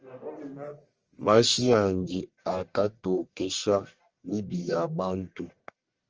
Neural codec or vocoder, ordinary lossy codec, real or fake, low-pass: codec, 44.1 kHz, 1.7 kbps, Pupu-Codec; Opus, 24 kbps; fake; 7.2 kHz